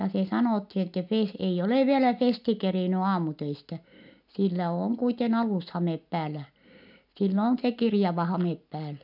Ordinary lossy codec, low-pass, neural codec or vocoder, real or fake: none; 5.4 kHz; none; real